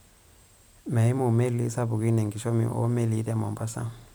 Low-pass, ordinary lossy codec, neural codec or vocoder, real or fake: none; none; vocoder, 44.1 kHz, 128 mel bands every 256 samples, BigVGAN v2; fake